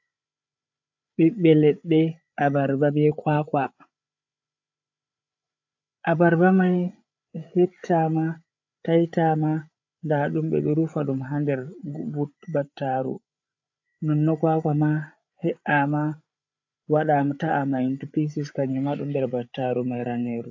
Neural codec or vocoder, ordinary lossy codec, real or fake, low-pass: codec, 16 kHz, 8 kbps, FreqCodec, larger model; AAC, 48 kbps; fake; 7.2 kHz